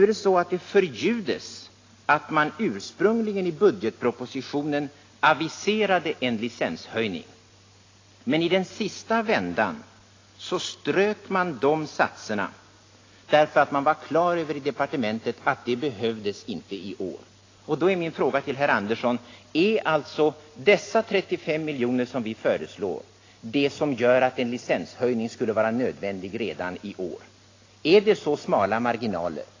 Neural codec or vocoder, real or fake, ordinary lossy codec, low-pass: none; real; AAC, 32 kbps; 7.2 kHz